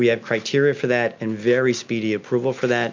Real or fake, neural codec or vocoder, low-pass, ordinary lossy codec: real; none; 7.2 kHz; MP3, 64 kbps